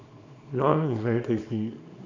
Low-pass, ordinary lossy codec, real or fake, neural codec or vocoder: 7.2 kHz; MP3, 48 kbps; fake; codec, 24 kHz, 0.9 kbps, WavTokenizer, small release